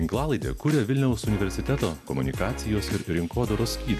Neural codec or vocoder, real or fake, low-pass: none; real; 14.4 kHz